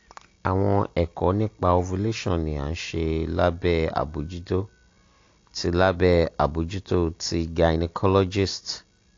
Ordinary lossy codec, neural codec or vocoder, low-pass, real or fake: AAC, 48 kbps; none; 7.2 kHz; real